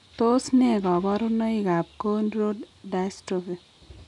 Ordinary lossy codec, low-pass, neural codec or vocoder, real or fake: none; 10.8 kHz; none; real